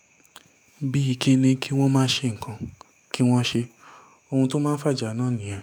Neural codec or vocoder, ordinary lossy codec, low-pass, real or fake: autoencoder, 48 kHz, 128 numbers a frame, DAC-VAE, trained on Japanese speech; none; 19.8 kHz; fake